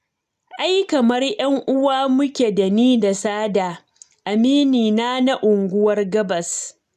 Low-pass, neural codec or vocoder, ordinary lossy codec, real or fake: 14.4 kHz; none; none; real